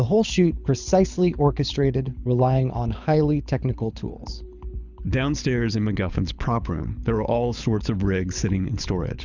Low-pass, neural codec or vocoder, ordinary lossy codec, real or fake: 7.2 kHz; codec, 24 kHz, 6 kbps, HILCodec; Opus, 64 kbps; fake